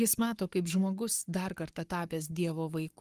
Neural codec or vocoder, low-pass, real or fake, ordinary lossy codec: codec, 44.1 kHz, 7.8 kbps, Pupu-Codec; 14.4 kHz; fake; Opus, 24 kbps